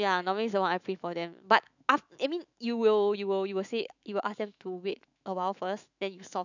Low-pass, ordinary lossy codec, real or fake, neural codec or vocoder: 7.2 kHz; none; fake; autoencoder, 48 kHz, 128 numbers a frame, DAC-VAE, trained on Japanese speech